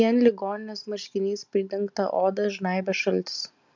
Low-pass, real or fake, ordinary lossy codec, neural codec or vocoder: 7.2 kHz; real; MP3, 64 kbps; none